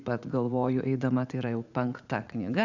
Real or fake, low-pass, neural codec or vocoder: fake; 7.2 kHz; vocoder, 44.1 kHz, 80 mel bands, Vocos